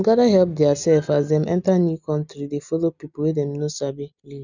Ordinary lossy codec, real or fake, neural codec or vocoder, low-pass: none; real; none; 7.2 kHz